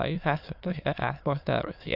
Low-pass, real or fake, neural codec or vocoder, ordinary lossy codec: 5.4 kHz; fake; autoencoder, 22.05 kHz, a latent of 192 numbers a frame, VITS, trained on many speakers; AAC, 48 kbps